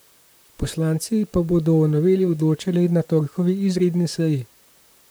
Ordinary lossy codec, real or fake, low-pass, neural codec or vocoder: none; fake; none; vocoder, 44.1 kHz, 128 mel bands, Pupu-Vocoder